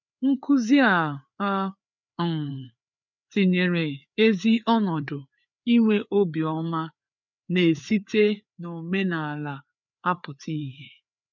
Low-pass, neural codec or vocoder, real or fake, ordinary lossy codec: 7.2 kHz; codec, 16 kHz, 4 kbps, FreqCodec, larger model; fake; none